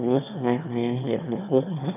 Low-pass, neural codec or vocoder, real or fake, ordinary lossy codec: 3.6 kHz; autoencoder, 22.05 kHz, a latent of 192 numbers a frame, VITS, trained on one speaker; fake; none